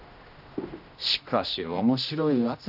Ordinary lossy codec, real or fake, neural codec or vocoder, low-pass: none; fake; codec, 16 kHz, 1 kbps, X-Codec, HuBERT features, trained on general audio; 5.4 kHz